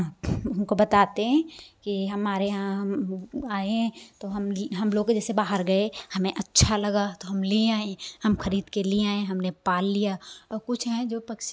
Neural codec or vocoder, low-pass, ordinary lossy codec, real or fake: none; none; none; real